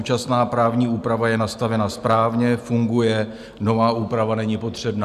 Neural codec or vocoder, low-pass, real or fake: none; 14.4 kHz; real